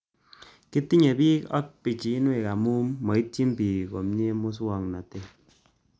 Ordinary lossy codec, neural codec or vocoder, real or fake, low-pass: none; none; real; none